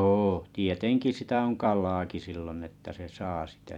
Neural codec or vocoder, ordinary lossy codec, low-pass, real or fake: vocoder, 44.1 kHz, 128 mel bands every 256 samples, BigVGAN v2; none; 19.8 kHz; fake